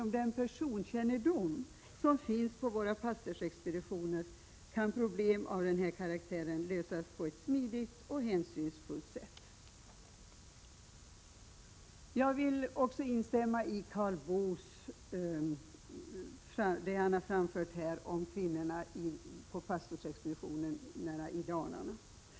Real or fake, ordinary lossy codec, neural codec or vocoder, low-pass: real; none; none; none